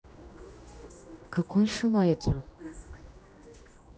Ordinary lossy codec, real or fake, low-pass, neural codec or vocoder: none; fake; none; codec, 16 kHz, 1 kbps, X-Codec, HuBERT features, trained on general audio